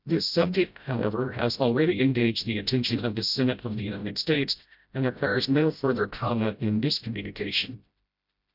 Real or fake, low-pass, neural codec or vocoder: fake; 5.4 kHz; codec, 16 kHz, 0.5 kbps, FreqCodec, smaller model